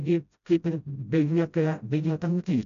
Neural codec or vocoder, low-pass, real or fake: codec, 16 kHz, 0.5 kbps, FreqCodec, smaller model; 7.2 kHz; fake